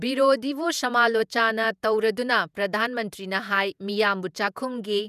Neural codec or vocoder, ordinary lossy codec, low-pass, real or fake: vocoder, 48 kHz, 128 mel bands, Vocos; none; 14.4 kHz; fake